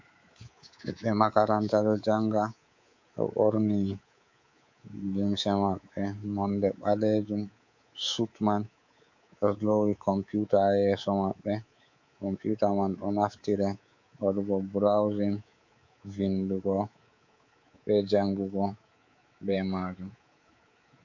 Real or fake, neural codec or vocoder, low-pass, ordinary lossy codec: fake; codec, 24 kHz, 3.1 kbps, DualCodec; 7.2 kHz; MP3, 48 kbps